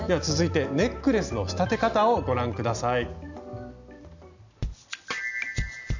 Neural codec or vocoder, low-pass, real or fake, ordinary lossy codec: none; 7.2 kHz; real; none